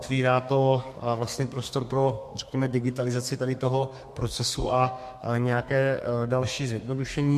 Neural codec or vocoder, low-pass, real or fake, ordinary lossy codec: codec, 32 kHz, 1.9 kbps, SNAC; 14.4 kHz; fake; MP3, 64 kbps